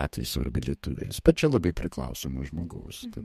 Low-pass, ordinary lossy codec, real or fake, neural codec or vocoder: 14.4 kHz; MP3, 64 kbps; fake; codec, 32 kHz, 1.9 kbps, SNAC